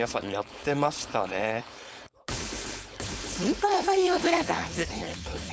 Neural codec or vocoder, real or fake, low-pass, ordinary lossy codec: codec, 16 kHz, 4.8 kbps, FACodec; fake; none; none